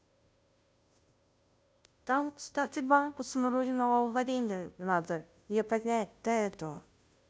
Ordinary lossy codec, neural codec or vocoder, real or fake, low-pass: none; codec, 16 kHz, 0.5 kbps, FunCodec, trained on Chinese and English, 25 frames a second; fake; none